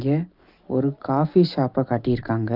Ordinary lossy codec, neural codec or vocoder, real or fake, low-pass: Opus, 24 kbps; none; real; 5.4 kHz